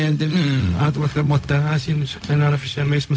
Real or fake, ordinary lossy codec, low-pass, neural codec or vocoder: fake; none; none; codec, 16 kHz, 0.4 kbps, LongCat-Audio-Codec